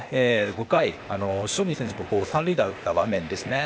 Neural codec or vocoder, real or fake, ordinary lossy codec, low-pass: codec, 16 kHz, 0.8 kbps, ZipCodec; fake; none; none